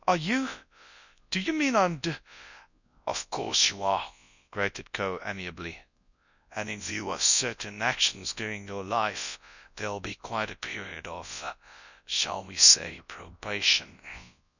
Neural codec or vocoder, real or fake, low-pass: codec, 24 kHz, 0.9 kbps, WavTokenizer, large speech release; fake; 7.2 kHz